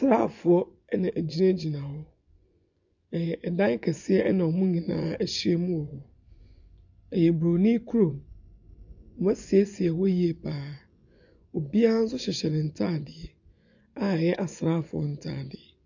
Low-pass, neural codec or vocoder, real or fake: 7.2 kHz; vocoder, 24 kHz, 100 mel bands, Vocos; fake